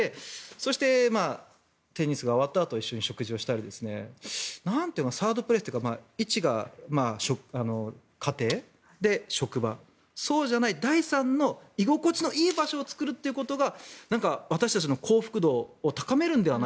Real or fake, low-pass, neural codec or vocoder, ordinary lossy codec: real; none; none; none